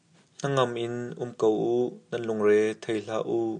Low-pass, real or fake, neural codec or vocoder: 9.9 kHz; real; none